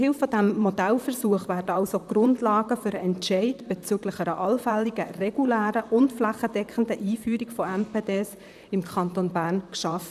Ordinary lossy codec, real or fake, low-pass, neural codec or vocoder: none; fake; 14.4 kHz; vocoder, 44.1 kHz, 128 mel bands, Pupu-Vocoder